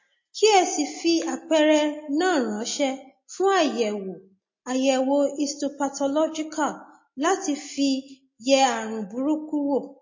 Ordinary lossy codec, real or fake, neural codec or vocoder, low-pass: MP3, 32 kbps; real; none; 7.2 kHz